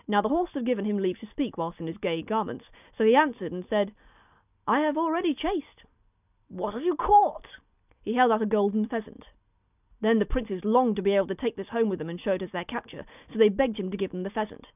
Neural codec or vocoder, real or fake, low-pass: none; real; 3.6 kHz